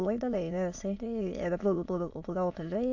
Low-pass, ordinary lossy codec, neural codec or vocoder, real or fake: 7.2 kHz; AAC, 48 kbps; autoencoder, 22.05 kHz, a latent of 192 numbers a frame, VITS, trained on many speakers; fake